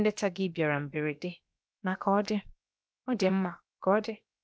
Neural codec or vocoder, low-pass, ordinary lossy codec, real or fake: codec, 16 kHz, 0.7 kbps, FocalCodec; none; none; fake